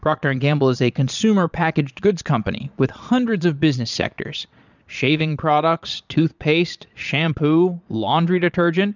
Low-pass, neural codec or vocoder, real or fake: 7.2 kHz; vocoder, 22.05 kHz, 80 mel bands, Vocos; fake